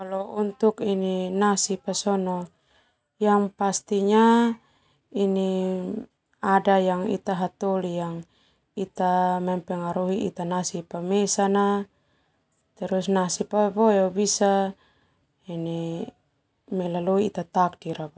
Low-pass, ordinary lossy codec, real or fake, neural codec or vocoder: none; none; real; none